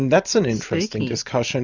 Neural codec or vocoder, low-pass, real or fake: none; 7.2 kHz; real